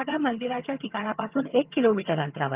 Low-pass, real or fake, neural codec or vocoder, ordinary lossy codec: 3.6 kHz; fake; vocoder, 22.05 kHz, 80 mel bands, HiFi-GAN; Opus, 24 kbps